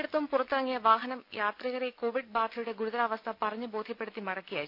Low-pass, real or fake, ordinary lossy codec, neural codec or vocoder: 5.4 kHz; real; none; none